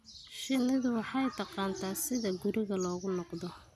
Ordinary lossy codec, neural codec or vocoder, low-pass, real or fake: none; none; 14.4 kHz; real